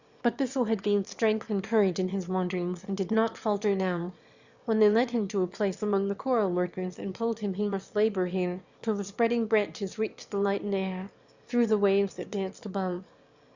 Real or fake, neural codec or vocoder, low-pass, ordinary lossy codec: fake; autoencoder, 22.05 kHz, a latent of 192 numbers a frame, VITS, trained on one speaker; 7.2 kHz; Opus, 64 kbps